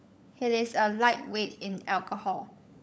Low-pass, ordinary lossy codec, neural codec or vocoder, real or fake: none; none; codec, 16 kHz, 16 kbps, FunCodec, trained on LibriTTS, 50 frames a second; fake